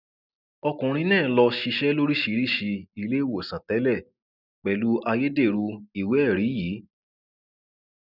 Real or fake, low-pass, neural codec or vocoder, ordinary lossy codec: real; 5.4 kHz; none; none